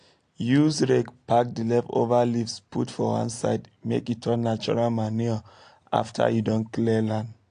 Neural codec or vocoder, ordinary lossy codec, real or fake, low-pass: none; AAC, 48 kbps; real; 9.9 kHz